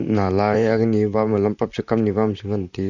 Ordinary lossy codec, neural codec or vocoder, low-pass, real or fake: none; vocoder, 44.1 kHz, 128 mel bands, Pupu-Vocoder; 7.2 kHz; fake